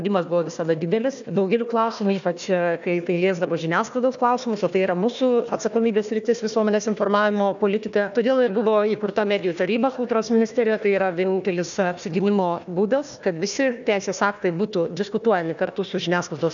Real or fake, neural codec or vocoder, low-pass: fake; codec, 16 kHz, 1 kbps, FunCodec, trained on Chinese and English, 50 frames a second; 7.2 kHz